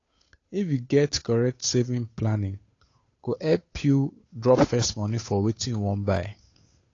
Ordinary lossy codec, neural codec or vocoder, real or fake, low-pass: AAC, 32 kbps; codec, 16 kHz, 8 kbps, FunCodec, trained on Chinese and English, 25 frames a second; fake; 7.2 kHz